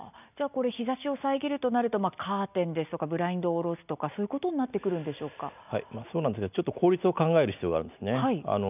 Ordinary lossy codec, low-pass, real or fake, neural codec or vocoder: none; 3.6 kHz; real; none